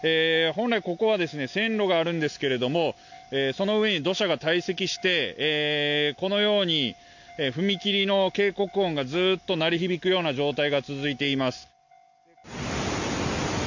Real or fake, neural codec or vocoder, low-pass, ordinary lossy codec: real; none; 7.2 kHz; none